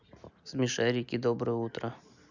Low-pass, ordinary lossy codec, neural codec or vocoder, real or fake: 7.2 kHz; none; none; real